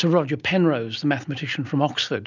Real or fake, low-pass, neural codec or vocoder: real; 7.2 kHz; none